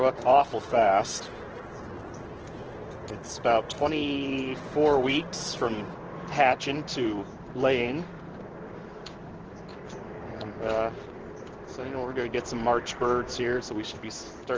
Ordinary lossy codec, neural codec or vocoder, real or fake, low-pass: Opus, 16 kbps; codec, 16 kHz in and 24 kHz out, 1 kbps, XY-Tokenizer; fake; 7.2 kHz